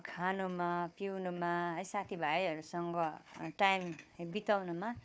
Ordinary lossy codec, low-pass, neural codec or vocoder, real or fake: none; none; codec, 16 kHz, 4 kbps, FunCodec, trained on Chinese and English, 50 frames a second; fake